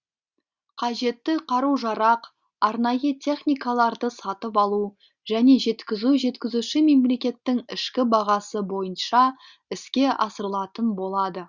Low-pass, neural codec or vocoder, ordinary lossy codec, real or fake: 7.2 kHz; none; Opus, 64 kbps; real